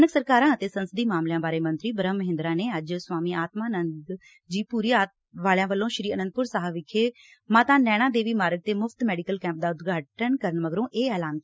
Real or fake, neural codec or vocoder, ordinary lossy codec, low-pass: real; none; none; none